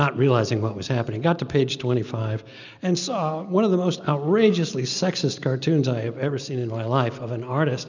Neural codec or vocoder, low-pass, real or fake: none; 7.2 kHz; real